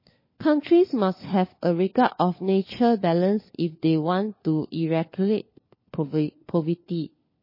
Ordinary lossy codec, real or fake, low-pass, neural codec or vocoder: MP3, 24 kbps; fake; 5.4 kHz; codec, 16 kHz, 4 kbps, FunCodec, trained on LibriTTS, 50 frames a second